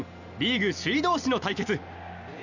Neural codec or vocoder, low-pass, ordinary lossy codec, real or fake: none; 7.2 kHz; none; real